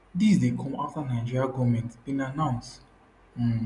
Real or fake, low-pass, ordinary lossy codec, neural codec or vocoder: real; 10.8 kHz; none; none